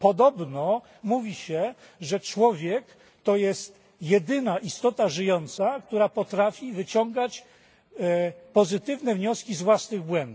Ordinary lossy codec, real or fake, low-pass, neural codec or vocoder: none; real; none; none